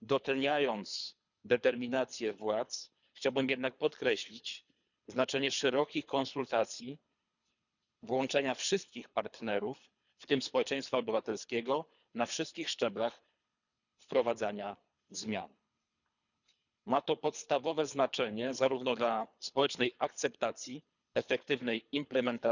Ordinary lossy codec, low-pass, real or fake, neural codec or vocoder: none; 7.2 kHz; fake; codec, 24 kHz, 3 kbps, HILCodec